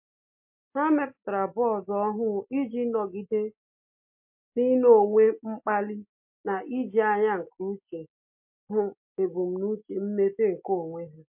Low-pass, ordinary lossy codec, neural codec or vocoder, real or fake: 3.6 kHz; MP3, 32 kbps; none; real